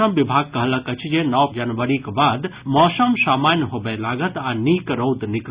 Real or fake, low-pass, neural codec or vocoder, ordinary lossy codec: real; 3.6 kHz; none; Opus, 64 kbps